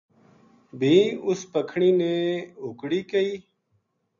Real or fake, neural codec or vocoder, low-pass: real; none; 7.2 kHz